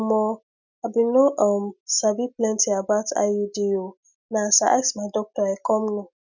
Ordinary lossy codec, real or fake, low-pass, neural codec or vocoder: none; real; 7.2 kHz; none